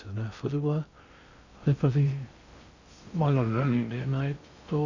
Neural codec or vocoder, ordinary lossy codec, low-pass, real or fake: codec, 16 kHz in and 24 kHz out, 0.6 kbps, FocalCodec, streaming, 2048 codes; Opus, 64 kbps; 7.2 kHz; fake